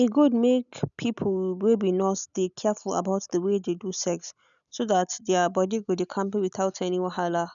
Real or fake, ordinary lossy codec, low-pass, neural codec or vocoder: real; none; 7.2 kHz; none